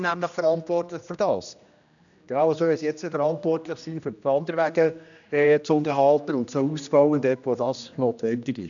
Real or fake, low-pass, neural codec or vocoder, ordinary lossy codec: fake; 7.2 kHz; codec, 16 kHz, 1 kbps, X-Codec, HuBERT features, trained on general audio; none